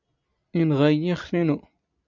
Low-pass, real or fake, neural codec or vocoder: 7.2 kHz; real; none